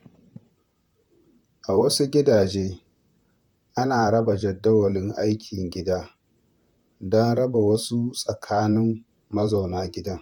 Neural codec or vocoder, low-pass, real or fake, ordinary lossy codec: vocoder, 44.1 kHz, 128 mel bands, Pupu-Vocoder; 19.8 kHz; fake; none